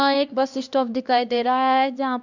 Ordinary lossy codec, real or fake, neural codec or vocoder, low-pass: none; fake; codec, 16 kHz, 1 kbps, X-Codec, HuBERT features, trained on LibriSpeech; 7.2 kHz